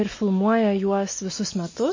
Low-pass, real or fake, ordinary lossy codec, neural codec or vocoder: 7.2 kHz; real; MP3, 32 kbps; none